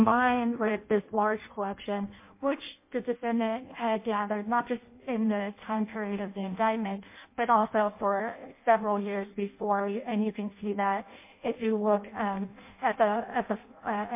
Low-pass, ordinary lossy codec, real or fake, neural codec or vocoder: 3.6 kHz; MP3, 24 kbps; fake; codec, 16 kHz in and 24 kHz out, 0.6 kbps, FireRedTTS-2 codec